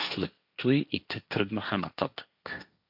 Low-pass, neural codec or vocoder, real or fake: 5.4 kHz; codec, 16 kHz, 1.1 kbps, Voila-Tokenizer; fake